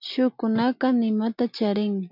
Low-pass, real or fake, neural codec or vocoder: 5.4 kHz; real; none